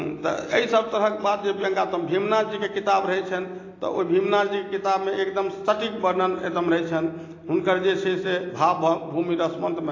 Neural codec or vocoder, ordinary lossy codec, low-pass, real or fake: none; AAC, 32 kbps; 7.2 kHz; real